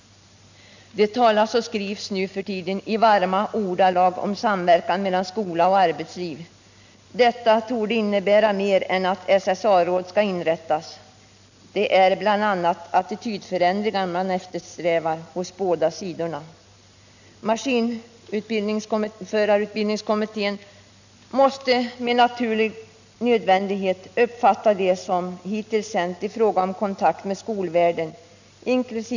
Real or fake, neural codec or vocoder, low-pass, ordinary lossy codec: real; none; 7.2 kHz; none